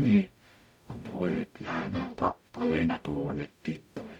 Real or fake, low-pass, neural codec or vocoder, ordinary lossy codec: fake; 19.8 kHz; codec, 44.1 kHz, 0.9 kbps, DAC; none